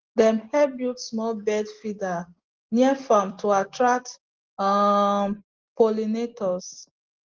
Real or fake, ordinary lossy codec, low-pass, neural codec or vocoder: real; Opus, 16 kbps; 7.2 kHz; none